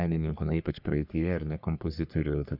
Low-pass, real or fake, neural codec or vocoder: 5.4 kHz; fake; codec, 44.1 kHz, 3.4 kbps, Pupu-Codec